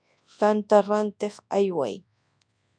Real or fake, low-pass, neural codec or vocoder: fake; 9.9 kHz; codec, 24 kHz, 0.9 kbps, WavTokenizer, large speech release